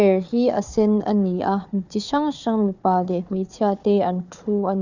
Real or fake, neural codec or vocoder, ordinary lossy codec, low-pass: fake; codec, 16 kHz, 4 kbps, FunCodec, trained on Chinese and English, 50 frames a second; none; 7.2 kHz